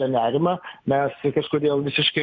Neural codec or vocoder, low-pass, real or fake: none; 7.2 kHz; real